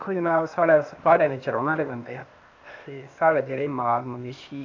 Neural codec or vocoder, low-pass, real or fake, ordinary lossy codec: codec, 16 kHz, 0.8 kbps, ZipCodec; 7.2 kHz; fake; AAC, 48 kbps